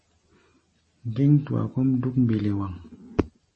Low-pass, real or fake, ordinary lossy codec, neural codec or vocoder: 10.8 kHz; real; MP3, 32 kbps; none